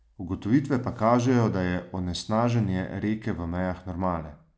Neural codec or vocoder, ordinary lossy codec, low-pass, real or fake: none; none; none; real